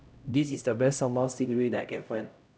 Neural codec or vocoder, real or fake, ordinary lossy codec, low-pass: codec, 16 kHz, 0.5 kbps, X-Codec, HuBERT features, trained on LibriSpeech; fake; none; none